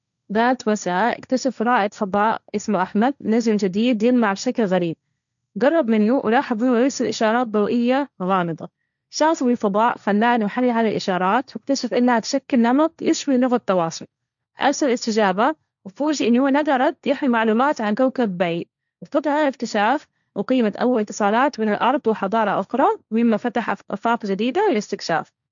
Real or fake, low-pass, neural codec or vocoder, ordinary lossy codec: fake; 7.2 kHz; codec, 16 kHz, 1.1 kbps, Voila-Tokenizer; none